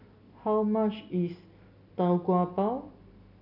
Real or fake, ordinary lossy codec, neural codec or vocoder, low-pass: real; none; none; 5.4 kHz